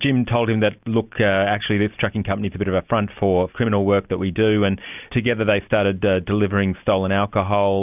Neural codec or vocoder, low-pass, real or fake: none; 3.6 kHz; real